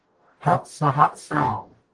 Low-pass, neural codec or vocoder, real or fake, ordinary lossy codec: 10.8 kHz; codec, 44.1 kHz, 0.9 kbps, DAC; fake; Opus, 24 kbps